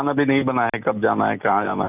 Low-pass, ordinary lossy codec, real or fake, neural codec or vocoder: 3.6 kHz; none; real; none